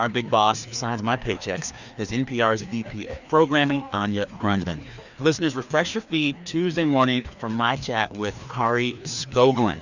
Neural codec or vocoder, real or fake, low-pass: codec, 16 kHz, 2 kbps, FreqCodec, larger model; fake; 7.2 kHz